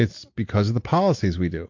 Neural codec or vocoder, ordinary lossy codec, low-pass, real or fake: none; MP3, 48 kbps; 7.2 kHz; real